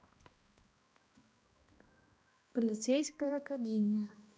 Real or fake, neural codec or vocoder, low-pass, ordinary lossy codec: fake; codec, 16 kHz, 1 kbps, X-Codec, HuBERT features, trained on balanced general audio; none; none